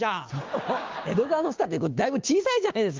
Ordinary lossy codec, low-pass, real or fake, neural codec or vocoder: Opus, 32 kbps; 7.2 kHz; fake; codec, 16 kHz, 6 kbps, DAC